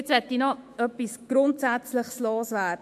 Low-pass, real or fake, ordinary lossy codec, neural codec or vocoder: 14.4 kHz; fake; MP3, 64 kbps; autoencoder, 48 kHz, 128 numbers a frame, DAC-VAE, trained on Japanese speech